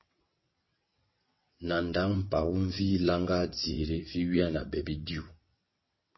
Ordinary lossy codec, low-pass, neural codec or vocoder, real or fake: MP3, 24 kbps; 7.2 kHz; vocoder, 22.05 kHz, 80 mel bands, WaveNeXt; fake